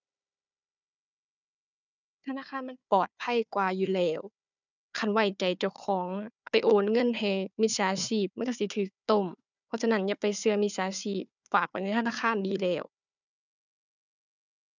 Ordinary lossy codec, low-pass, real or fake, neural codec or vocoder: none; 7.2 kHz; fake; codec, 16 kHz, 4 kbps, FunCodec, trained on Chinese and English, 50 frames a second